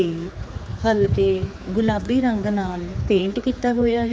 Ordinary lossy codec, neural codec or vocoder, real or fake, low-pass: none; codec, 16 kHz, 4 kbps, X-Codec, HuBERT features, trained on general audio; fake; none